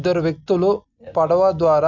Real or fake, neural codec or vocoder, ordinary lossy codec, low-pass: real; none; none; 7.2 kHz